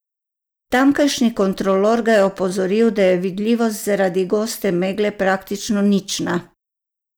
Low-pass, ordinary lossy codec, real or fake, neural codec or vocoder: none; none; real; none